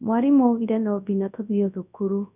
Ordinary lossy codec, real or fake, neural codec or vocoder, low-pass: none; fake; codec, 24 kHz, 0.9 kbps, WavTokenizer, large speech release; 3.6 kHz